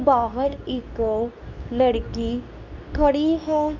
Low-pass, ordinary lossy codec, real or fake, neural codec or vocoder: 7.2 kHz; none; fake; codec, 24 kHz, 0.9 kbps, WavTokenizer, medium speech release version 2